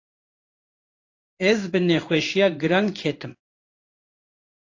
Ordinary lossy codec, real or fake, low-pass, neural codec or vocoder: AAC, 48 kbps; fake; 7.2 kHz; codec, 16 kHz in and 24 kHz out, 1 kbps, XY-Tokenizer